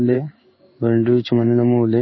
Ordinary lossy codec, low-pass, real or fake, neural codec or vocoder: MP3, 24 kbps; 7.2 kHz; fake; codec, 16 kHz in and 24 kHz out, 1 kbps, XY-Tokenizer